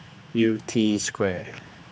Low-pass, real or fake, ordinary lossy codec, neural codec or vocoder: none; fake; none; codec, 16 kHz, 2 kbps, X-Codec, HuBERT features, trained on general audio